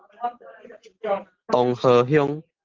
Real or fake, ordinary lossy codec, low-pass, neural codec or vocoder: real; Opus, 16 kbps; 7.2 kHz; none